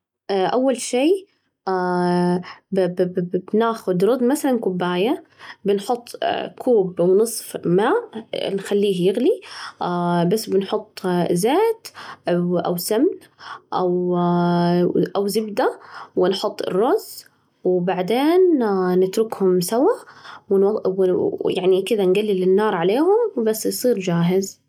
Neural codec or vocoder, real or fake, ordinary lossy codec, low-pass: none; real; none; 19.8 kHz